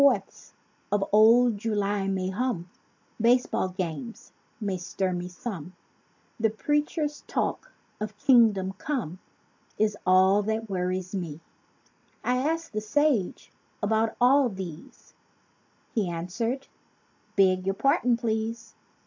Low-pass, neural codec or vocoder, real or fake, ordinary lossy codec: 7.2 kHz; none; real; AAC, 48 kbps